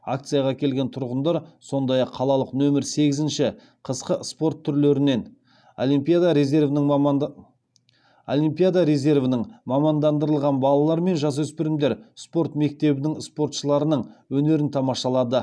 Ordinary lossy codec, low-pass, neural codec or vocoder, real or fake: none; none; none; real